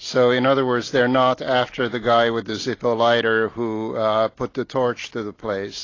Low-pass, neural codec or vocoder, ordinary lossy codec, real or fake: 7.2 kHz; none; AAC, 32 kbps; real